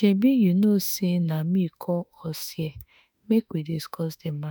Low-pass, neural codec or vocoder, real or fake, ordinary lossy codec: none; autoencoder, 48 kHz, 32 numbers a frame, DAC-VAE, trained on Japanese speech; fake; none